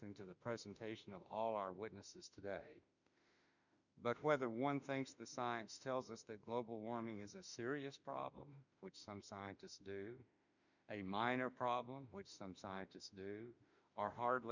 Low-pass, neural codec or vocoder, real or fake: 7.2 kHz; autoencoder, 48 kHz, 32 numbers a frame, DAC-VAE, trained on Japanese speech; fake